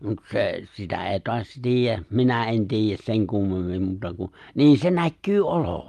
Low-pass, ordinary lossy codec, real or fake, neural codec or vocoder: 14.4 kHz; Opus, 32 kbps; real; none